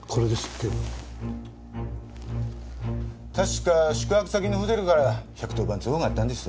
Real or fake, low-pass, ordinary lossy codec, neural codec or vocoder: real; none; none; none